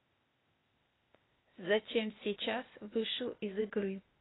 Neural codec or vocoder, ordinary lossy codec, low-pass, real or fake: codec, 16 kHz, 0.8 kbps, ZipCodec; AAC, 16 kbps; 7.2 kHz; fake